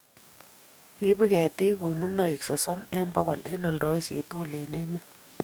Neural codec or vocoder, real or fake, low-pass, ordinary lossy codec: codec, 44.1 kHz, 2.6 kbps, DAC; fake; none; none